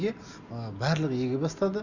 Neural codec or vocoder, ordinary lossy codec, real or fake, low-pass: none; Opus, 64 kbps; real; 7.2 kHz